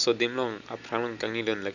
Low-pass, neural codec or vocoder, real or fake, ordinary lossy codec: 7.2 kHz; none; real; none